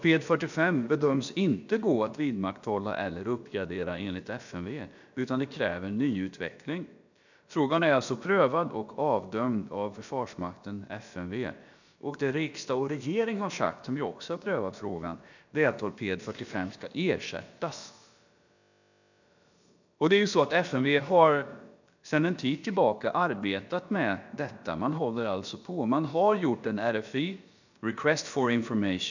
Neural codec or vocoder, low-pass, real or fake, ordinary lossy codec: codec, 16 kHz, about 1 kbps, DyCAST, with the encoder's durations; 7.2 kHz; fake; none